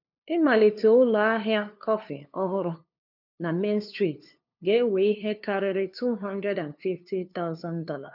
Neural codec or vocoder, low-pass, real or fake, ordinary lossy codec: codec, 16 kHz, 2 kbps, FunCodec, trained on LibriTTS, 25 frames a second; 5.4 kHz; fake; none